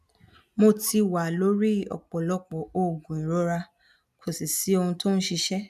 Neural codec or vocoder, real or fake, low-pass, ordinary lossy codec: none; real; 14.4 kHz; none